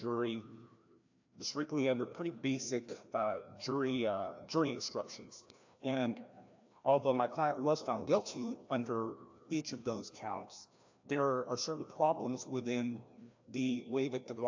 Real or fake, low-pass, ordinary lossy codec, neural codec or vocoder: fake; 7.2 kHz; AAC, 48 kbps; codec, 16 kHz, 1 kbps, FreqCodec, larger model